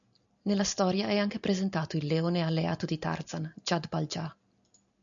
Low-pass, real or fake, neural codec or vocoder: 7.2 kHz; real; none